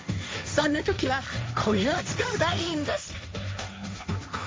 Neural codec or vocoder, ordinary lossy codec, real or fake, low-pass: codec, 16 kHz, 1.1 kbps, Voila-Tokenizer; none; fake; none